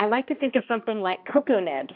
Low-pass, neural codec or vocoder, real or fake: 5.4 kHz; codec, 16 kHz, 1 kbps, X-Codec, HuBERT features, trained on balanced general audio; fake